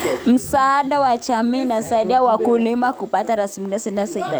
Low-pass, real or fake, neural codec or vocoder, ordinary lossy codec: none; fake; codec, 44.1 kHz, 7.8 kbps, DAC; none